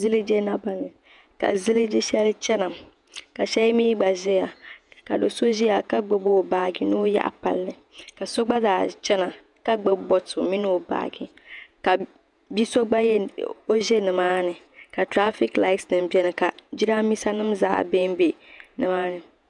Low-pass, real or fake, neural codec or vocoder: 10.8 kHz; fake; vocoder, 48 kHz, 128 mel bands, Vocos